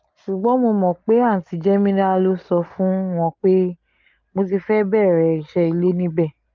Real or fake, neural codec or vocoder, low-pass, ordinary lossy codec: real; none; 7.2 kHz; Opus, 24 kbps